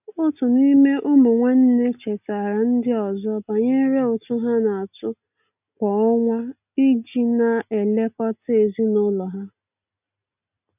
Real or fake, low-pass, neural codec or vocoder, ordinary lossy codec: real; 3.6 kHz; none; none